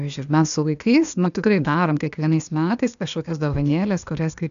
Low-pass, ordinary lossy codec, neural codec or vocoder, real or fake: 7.2 kHz; MP3, 96 kbps; codec, 16 kHz, 0.8 kbps, ZipCodec; fake